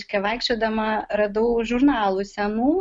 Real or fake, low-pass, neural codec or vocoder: real; 9.9 kHz; none